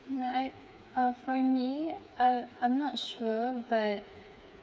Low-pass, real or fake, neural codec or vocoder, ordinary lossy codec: none; fake; codec, 16 kHz, 4 kbps, FreqCodec, smaller model; none